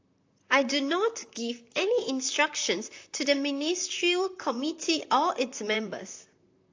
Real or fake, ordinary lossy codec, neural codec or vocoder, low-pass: fake; AAC, 48 kbps; vocoder, 44.1 kHz, 128 mel bands, Pupu-Vocoder; 7.2 kHz